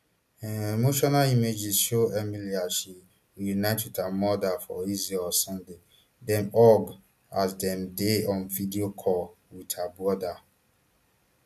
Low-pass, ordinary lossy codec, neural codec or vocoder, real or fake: 14.4 kHz; none; none; real